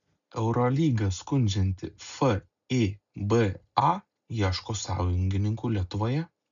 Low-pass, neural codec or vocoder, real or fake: 7.2 kHz; none; real